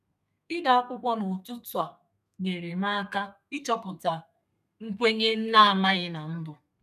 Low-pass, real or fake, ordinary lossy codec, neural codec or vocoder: 14.4 kHz; fake; none; codec, 32 kHz, 1.9 kbps, SNAC